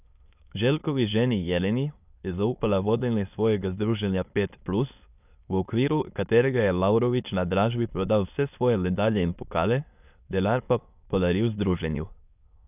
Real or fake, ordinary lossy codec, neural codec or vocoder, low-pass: fake; none; autoencoder, 22.05 kHz, a latent of 192 numbers a frame, VITS, trained on many speakers; 3.6 kHz